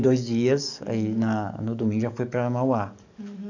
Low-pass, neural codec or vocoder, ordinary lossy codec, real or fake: 7.2 kHz; codec, 44.1 kHz, 7.8 kbps, DAC; none; fake